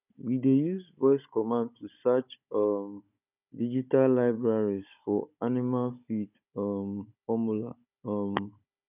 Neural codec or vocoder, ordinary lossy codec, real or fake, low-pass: codec, 16 kHz, 16 kbps, FunCodec, trained on Chinese and English, 50 frames a second; none; fake; 3.6 kHz